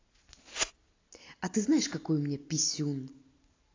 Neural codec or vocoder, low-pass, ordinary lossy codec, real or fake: none; 7.2 kHz; AAC, 32 kbps; real